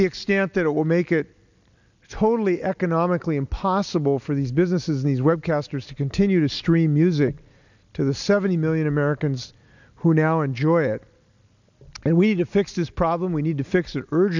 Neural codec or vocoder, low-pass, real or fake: none; 7.2 kHz; real